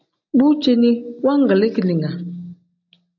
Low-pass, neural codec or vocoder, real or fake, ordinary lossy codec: 7.2 kHz; none; real; AAC, 48 kbps